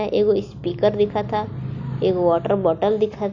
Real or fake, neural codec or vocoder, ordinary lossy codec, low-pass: real; none; AAC, 48 kbps; 7.2 kHz